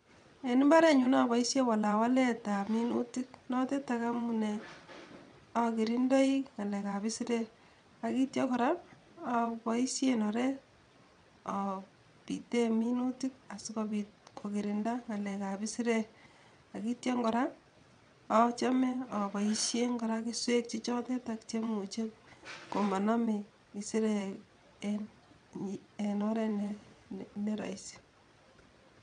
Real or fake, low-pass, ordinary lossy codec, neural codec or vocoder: fake; 9.9 kHz; none; vocoder, 22.05 kHz, 80 mel bands, WaveNeXt